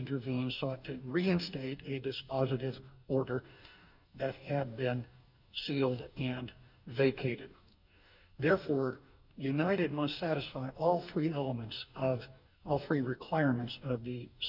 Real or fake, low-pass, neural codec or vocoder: fake; 5.4 kHz; codec, 44.1 kHz, 2.6 kbps, DAC